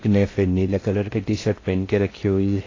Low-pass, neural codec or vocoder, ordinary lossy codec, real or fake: 7.2 kHz; codec, 16 kHz in and 24 kHz out, 0.6 kbps, FocalCodec, streaming, 4096 codes; AAC, 32 kbps; fake